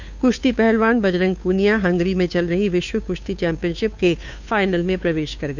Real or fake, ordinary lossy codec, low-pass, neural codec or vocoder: fake; none; 7.2 kHz; codec, 16 kHz, 2 kbps, FunCodec, trained on Chinese and English, 25 frames a second